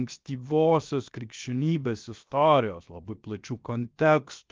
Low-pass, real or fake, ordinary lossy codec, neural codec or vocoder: 7.2 kHz; fake; Opus, 24 kbps; codec, 16 kHz, 0.7 kbps, FocalCodec